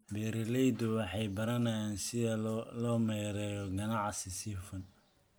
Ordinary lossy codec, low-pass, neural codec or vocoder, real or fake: none; none; none; real